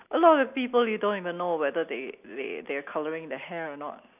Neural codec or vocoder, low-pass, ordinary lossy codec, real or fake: none; 3.6 kHz; none; real